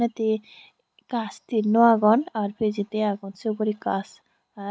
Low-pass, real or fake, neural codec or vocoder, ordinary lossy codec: none; real; none; none